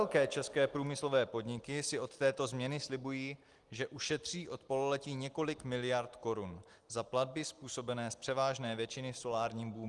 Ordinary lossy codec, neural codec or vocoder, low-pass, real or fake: Opus, 24 kbps; none; 10.8 kHz; real